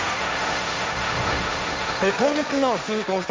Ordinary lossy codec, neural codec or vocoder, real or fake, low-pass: none; codec, 16 kHz, 1.1 kbps, Voila-Tokenizer; fake; none